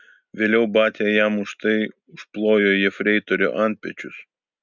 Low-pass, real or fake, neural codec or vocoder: 7.2 kHz; real; none